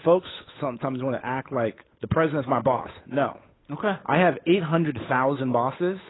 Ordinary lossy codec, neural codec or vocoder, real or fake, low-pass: AAC, 16 kbps; codec, 16 kHz, 8 kbps, FunCodec, trained on Chinese and English, 25 frames a second; fake; 7.2 kHz